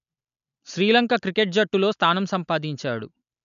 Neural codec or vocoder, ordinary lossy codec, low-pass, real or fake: none; none; 7.2 kHz; real